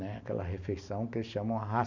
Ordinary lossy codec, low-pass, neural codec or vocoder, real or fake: none; 7.2 kHz; none; real